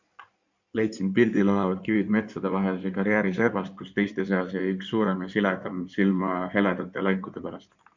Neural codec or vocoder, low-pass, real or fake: codec, 16 kHz in and 24 kHz out, 2.2 kbps, FireRedTTS-2 codec; 7.2 kHz; fake